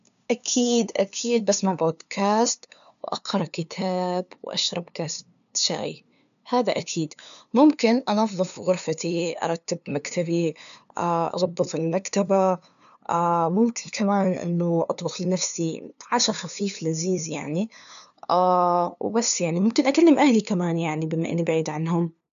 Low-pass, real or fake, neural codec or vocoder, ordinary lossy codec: 7.2 kHz; fake; codec, 16 kHz, 2 kbps, FunCodec, trained on LibriTTS, 25 frames a second; none